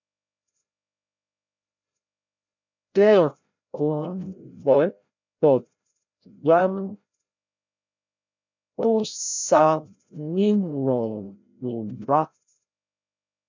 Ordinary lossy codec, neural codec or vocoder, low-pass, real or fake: MP3, 64 kbps; codec, 16 kHz, 0.5 kbps, FreqCodec, larger model; 7.2 kHz; fake